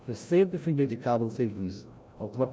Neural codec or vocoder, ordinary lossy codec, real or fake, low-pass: codec, 16 kHz, 0.5 kbps, FreqCodec, larger model; none; fake; none